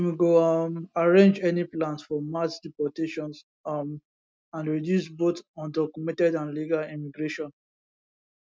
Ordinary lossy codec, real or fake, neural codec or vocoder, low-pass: none; real; none; none